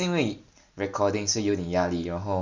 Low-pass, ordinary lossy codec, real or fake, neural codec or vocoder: 7.2 kHz; Opus, 64 kbps; real; none